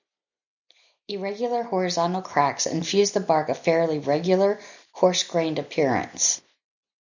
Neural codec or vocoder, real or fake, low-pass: none; real; 7.2 kHz